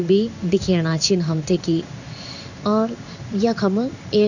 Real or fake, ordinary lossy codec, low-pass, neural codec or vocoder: fake; none; 7.2 kHz; codec, 16 kHz in and 24 kHz out, 1 kbps, XY-Tokenizer